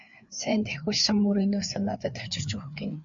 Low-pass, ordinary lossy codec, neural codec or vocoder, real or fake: 7.2 kHz; MP3, 64 kbps; codec, 16 kHz, 4 kbps, FreqCodec, larger model; fake